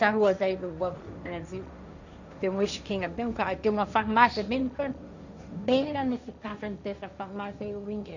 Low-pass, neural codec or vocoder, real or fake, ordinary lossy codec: 7.2 kHz; codec, 16 kHz, 1.1 kbps, Voila-Tokenizer; fake; none